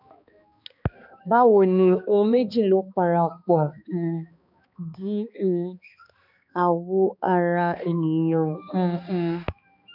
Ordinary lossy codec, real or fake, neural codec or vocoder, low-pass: none; fake; codec, 16 kHz, 2 kbps, X-Codec, HuBERT features, trained on balanced general audio; 5.4 kHz